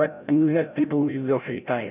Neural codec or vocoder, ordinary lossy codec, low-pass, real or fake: codec, 16 kHz, 0.5 kbps, FreqCodec, larger model; none; 3.6 kHz; fake